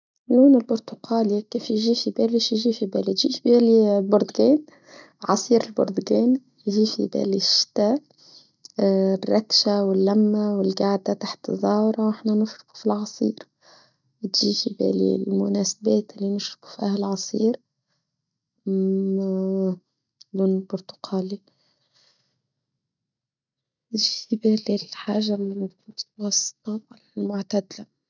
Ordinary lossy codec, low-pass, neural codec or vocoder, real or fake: none; 7.2 kHz; none; real